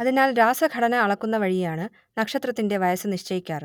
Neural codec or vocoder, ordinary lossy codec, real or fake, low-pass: none; none; real; 19.8 kHz